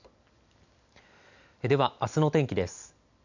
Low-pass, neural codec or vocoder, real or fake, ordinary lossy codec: 7.2 kHz; none; real; none